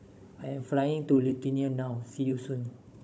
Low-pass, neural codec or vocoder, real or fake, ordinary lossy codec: none; codec, 16 kHz, 16 kbps, FunCodec, trained on Chinese and English, 50 frames a second; fake; none